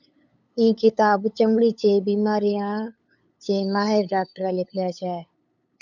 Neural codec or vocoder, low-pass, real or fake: codec, 16 kHz, 8 kbps, FunCodec, trained on LibriTTS, 25 frames a second; 7.2 kHz; fake